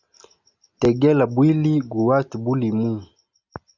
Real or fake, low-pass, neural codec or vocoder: real; 7.2 kHz; none